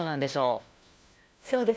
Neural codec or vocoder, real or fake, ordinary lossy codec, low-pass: codec, 16 kHz, 1 kbps, FunCodec, trained on LibriTTS, 50 frames a second; fake; none; none